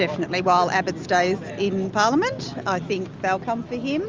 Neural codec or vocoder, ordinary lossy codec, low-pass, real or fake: none; Opus, 32 kbps; 7.2 kHz; real